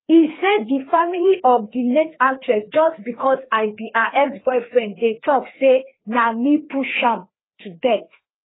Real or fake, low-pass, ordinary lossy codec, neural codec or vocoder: fake; 7.2 kHz; AAC, 16 kbps; codec, 16 kHz, 2 kbps, FreqCodec, larger model